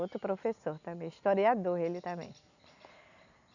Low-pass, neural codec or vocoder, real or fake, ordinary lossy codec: 7.2 kHz; none; real; none